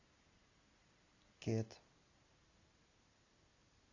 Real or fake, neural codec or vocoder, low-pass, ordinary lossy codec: real; none; 7.2 kHz; AAC, 32 kbps